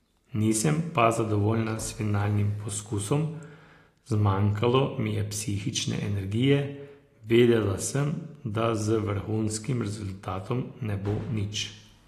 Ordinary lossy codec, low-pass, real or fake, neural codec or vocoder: AAC, 48 kbps; 14.4 kHz; real; none